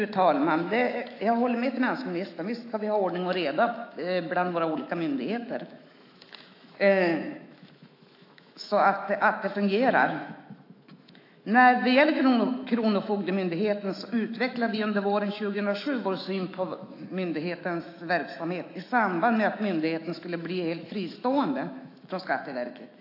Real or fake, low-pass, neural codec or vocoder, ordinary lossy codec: fake; 5.4 kHz; autoencoder, 48 kHz, 128 numbers a frame, DAC-VAE, trained on Japanese speech; AAC, 32 kbps